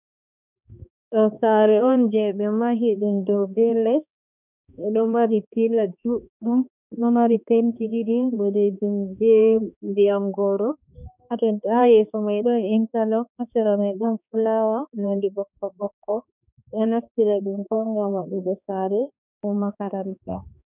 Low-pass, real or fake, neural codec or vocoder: 3.6 kHz; fake; codec, 16 kHz, 2 kbps, X-Codec, HuBERT features, trained on balanced general audio